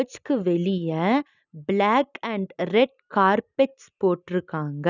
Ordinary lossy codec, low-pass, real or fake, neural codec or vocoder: none; 7.2 kHz; real; none